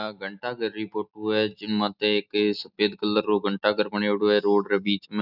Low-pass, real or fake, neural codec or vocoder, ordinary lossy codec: 5.4 kHz; real; none; none